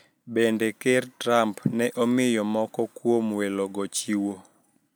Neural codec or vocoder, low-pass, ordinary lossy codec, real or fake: none; none; none; real